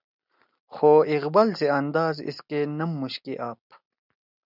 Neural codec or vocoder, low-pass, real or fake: none; 5.4 kHz; real